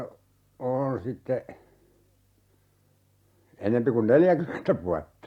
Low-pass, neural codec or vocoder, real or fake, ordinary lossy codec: 19.8 kHz; none; real; Opus, 64 kbps